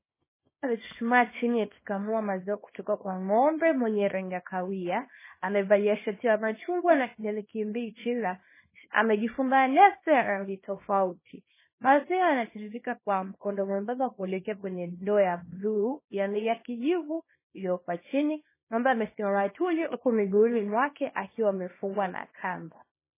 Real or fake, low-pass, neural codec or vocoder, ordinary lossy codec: fake; 3.6 kHz; codec, 24 kHz, 0.9 kbps, WavTokenizer, small release; MP3, 16 kbps